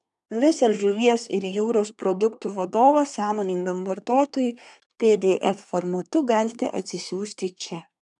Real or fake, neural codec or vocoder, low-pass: fake; codec, 24 kHz, 1 kbps, SNAC; 10.8 kHz